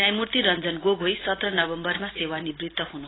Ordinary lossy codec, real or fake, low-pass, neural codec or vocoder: AAC, 16 kbps; real; 7.2 kHz; none